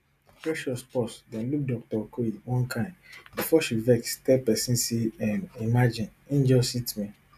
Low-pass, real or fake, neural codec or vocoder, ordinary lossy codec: 14.4 kHz; real; none; none